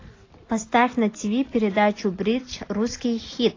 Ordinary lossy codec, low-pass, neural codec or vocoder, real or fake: AAC, 32 kbps; 7.2 kHz; none; real